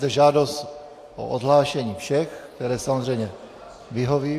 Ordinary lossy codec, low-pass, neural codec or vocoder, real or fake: AAC, 64 kbps; 14.4 kHz; none; real